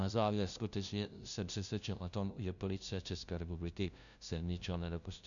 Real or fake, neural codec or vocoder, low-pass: fake; codec, 16 kHz, 0.5 kbps, FunCodec, trained on LibriTTS, 25 frames a second; 7.2 kHz